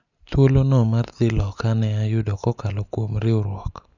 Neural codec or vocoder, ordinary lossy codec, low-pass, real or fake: none; none; 7.2 kHz; real